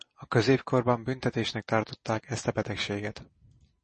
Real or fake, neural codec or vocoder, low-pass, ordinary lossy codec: real; none; 10.8 kHz; MP3, 32 kbps